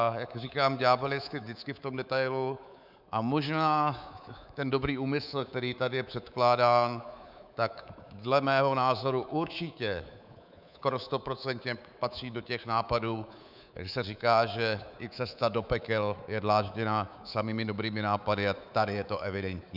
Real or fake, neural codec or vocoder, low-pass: fake; codec, 24 kHz, 3.1 kbps, DualCodec; 5.4 kHz